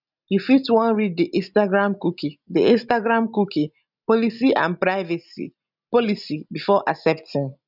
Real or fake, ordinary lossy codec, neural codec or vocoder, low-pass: real; none; none; 5.4 kHz